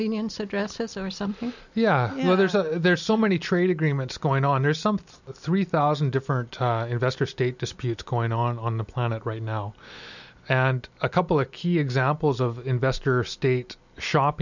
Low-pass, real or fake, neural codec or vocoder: 7.2 kHz; real; none